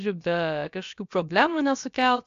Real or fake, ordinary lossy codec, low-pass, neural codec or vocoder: fake; AAC, 64 kbps; 7.2 kHz; codec, 16 kHz, about 1 kbps, DyCAST, with the encoder's durations